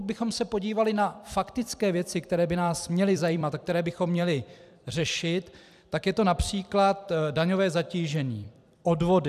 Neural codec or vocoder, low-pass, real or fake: vocoder, 44.1 kHz, 128 mel bands every 256 samples, BigVGAN v2; 14.4 kHz; fake